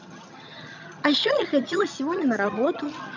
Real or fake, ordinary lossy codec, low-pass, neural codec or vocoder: fake; none; 7.2 kHz; vocoder, 22.05 kHz, 80 mel bands, HiFi-GAN